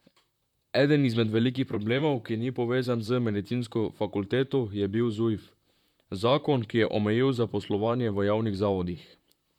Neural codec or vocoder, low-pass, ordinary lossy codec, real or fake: vocoder, 44.1 kHz, 128 mel bands, Pupu-Vocoder; 19.8 kHz; none; fake